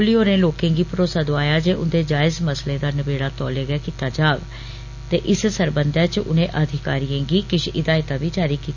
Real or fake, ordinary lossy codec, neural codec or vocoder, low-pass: real; none; none; 7.2 kHz